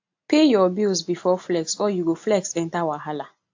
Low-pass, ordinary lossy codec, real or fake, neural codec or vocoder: 7.2 kHz; AAC, 48 kbps; real; none